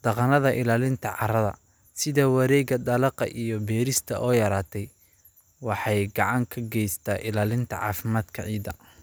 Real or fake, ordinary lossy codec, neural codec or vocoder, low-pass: real; none; none; none